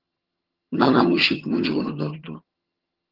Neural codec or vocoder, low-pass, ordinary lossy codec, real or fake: vocoder, 22.05 kHz, 80 mel bands, HiFi-GAN; 5.4 kHz; Opus, 16 kbps; fake